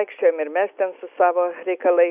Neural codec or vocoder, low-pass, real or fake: none; 3.6 kHz; real